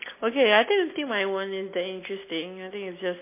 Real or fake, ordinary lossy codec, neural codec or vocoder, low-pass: real; MP3, 24 kbps; none; 3.6 kHz